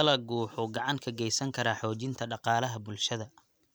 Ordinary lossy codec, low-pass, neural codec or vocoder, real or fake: none; none; none; real